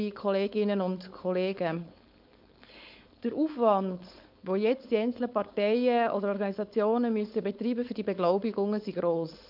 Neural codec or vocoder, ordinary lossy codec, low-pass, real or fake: codec, 16 kHz, 4.8 kbps, FACodec; none; 5.4 kHz; fake